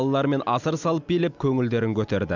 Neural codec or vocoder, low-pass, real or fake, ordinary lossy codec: none; 7.2 kHz; real; none